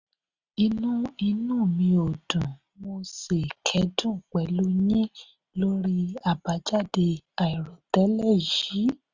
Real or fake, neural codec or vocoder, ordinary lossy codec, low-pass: real; none; Opus, 64 kbps; 7.2 kHz